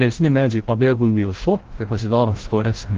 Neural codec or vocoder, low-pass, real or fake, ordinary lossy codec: codec, 16 kHz, 0.5 kbps, FreqCodec, larger model; 7.2 kHz; fake; Opus, 16 kbps